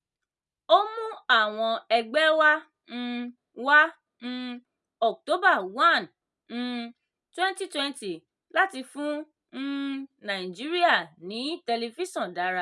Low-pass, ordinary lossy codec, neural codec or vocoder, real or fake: none; none; none; real